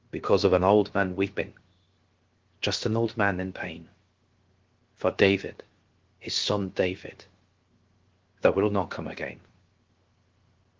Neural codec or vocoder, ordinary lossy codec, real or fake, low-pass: codec, 16 kHz, 0.7 kbps, FocalCodec; Opus, 16 kbps; fake; 7.2 kHz